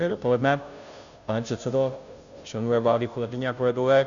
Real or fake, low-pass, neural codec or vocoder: fake; 7.2 kHz; codec, 16 kHz, 0.5 kbps, FunCodec, trained on Chinese and English, 25 frames a second